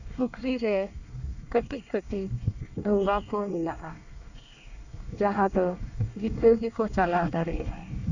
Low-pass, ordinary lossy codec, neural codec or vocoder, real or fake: 7.2 kHz; none; codec, 24 kHz, 1 kbps, SNAC; fake